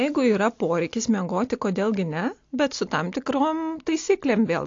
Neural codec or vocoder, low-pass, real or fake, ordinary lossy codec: none; 7.2 kHz; real; AAC, 48 kbps